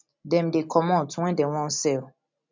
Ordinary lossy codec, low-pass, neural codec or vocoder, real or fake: MP3, 64 kbps; 7.2 kHz; none; real